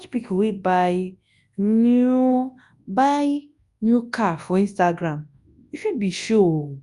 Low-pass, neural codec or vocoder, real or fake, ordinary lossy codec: 10.8 kHz; codec, 24 kHz, 0.9 kbps, WavTokenizer, large speech release; fake; Opus, 64 kbps